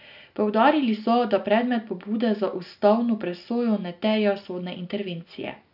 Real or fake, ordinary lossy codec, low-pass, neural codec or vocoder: real; none; 5.4 kHz; none